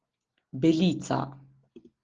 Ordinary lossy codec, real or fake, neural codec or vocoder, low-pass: Opus, 16 kbps; real; none; 7.2 kHz